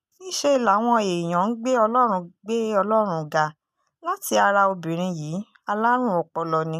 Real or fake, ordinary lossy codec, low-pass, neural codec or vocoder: real; none; 14.4 kHz; none